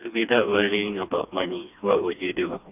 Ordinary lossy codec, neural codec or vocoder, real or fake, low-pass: none; codec, 16 kHz, 2 kbps, FreqCodec, smaller model; fake; 3.6 kHz